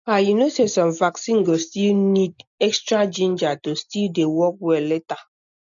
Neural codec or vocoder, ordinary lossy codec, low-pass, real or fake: none; none; 7.2 kHz; real